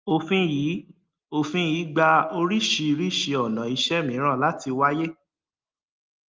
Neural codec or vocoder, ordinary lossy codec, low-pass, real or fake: none; Opus, 32 kbps; 7.2 kHz; real